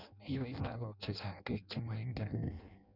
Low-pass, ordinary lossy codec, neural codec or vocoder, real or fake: 5.4 kHz; none; codec, 16 kHz in and 24 kHz out, 0.6 kbps, FireRedTTS-2 codec; fake